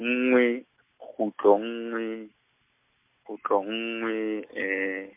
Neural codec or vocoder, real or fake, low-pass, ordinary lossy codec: none; real; 3.6 kHz; MP3, 24 kbps